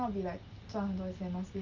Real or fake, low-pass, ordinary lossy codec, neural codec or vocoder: real; 7.2 kHz; Opus, 24 kbps; none